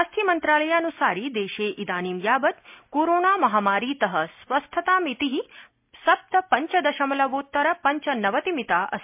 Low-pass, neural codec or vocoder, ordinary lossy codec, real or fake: 3.6 kHz; none; MP3, 32 kbps; real